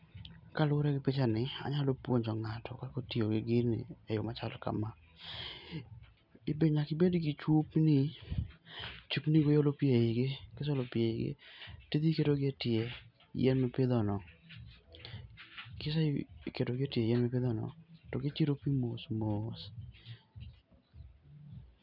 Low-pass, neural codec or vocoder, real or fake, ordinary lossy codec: 5.4 kHz; none; real; none